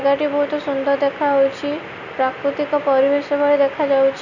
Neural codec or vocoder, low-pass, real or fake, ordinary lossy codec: none; 7.2 kHz; real; none